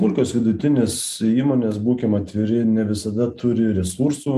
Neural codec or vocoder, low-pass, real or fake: none; 14.4 kHz; real